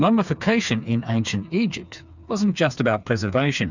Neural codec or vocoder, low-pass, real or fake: codec, 16 kHz, 4 kbps, FreqCodec, smaller model; 7.2 kHz; fake